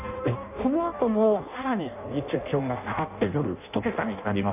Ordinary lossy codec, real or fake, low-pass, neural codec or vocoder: none; fake; 3.6 kHz; codec, 16 kHz in and 24 kHz out, 0.6 kbps, FireRedTTS-2 codec